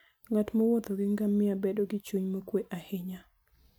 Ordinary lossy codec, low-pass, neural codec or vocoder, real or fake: none; none; none; real